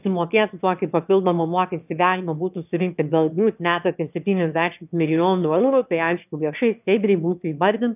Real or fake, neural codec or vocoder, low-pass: fake; autoencoder, 22.05 kHz, a latent of 192 numbers a frame, VITS, trained on one speaker; 3.6 kHz